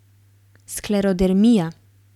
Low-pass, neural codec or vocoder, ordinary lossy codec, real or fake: 19.8 kHz; none; none; real